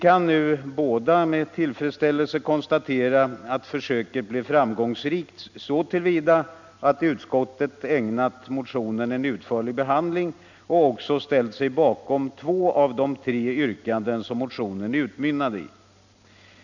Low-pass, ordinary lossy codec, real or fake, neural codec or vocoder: 7.2 kHz; none; real; none